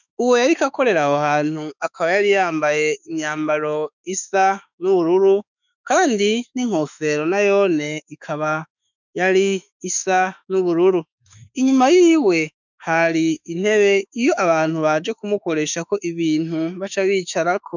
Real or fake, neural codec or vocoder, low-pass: fake; autoencoder, 48 kHz, 32 numbers a frame, DAC-VAE, trained on Japanese speech; 7.2 kHz